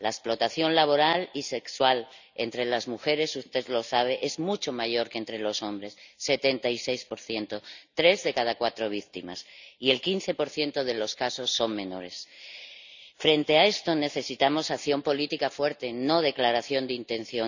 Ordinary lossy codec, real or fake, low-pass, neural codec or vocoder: none; real; 7.2 kHz; none